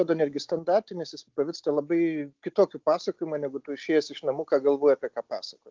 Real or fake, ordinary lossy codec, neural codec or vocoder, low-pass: real; Opus, 24 kbps; none; 7.2 kHz